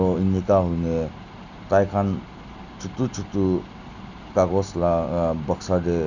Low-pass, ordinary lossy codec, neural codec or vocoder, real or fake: 7.2 kHz; none; none; real